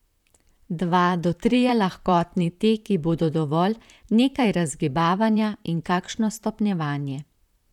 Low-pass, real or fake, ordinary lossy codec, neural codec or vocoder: 19.8 kHz; fake; none; vocoder, 44.1 kHz, 128 mel bands, Pupu-Vocoder